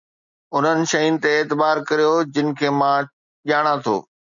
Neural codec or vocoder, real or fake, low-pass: none; real; 7.2 kHz